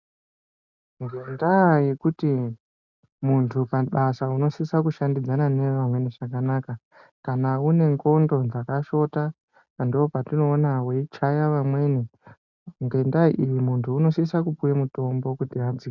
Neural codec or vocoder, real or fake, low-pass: none; real; 7.2 kHz